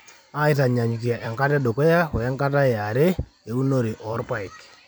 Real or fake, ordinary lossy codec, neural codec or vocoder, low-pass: fake; none; vocoder, 44.1 kHz, 128 mel bands every 256 samples, BigVGAN v2; none